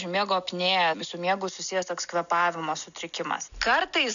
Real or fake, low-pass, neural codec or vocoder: real; 7.2 kHz; none